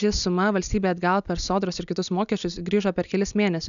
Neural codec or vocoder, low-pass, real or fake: none; 7.2 kHz; real